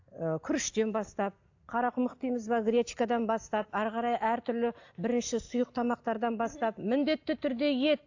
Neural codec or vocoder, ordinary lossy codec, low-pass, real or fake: none; AAC, 48 kbps; 7.2 kHz; real